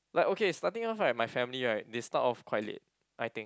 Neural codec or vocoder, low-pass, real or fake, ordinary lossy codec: none; none; real; none